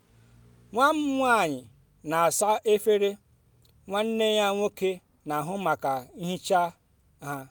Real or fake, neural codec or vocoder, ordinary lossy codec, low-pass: real; none; none; none